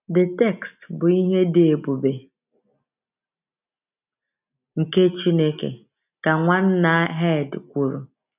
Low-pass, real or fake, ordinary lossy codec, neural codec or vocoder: 3.6 kHz; real; none; none